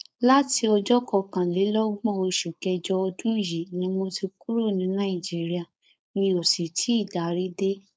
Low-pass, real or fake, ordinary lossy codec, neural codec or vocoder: none; fake; none; codec, 16 kHz, 4.8 kbps, FACodec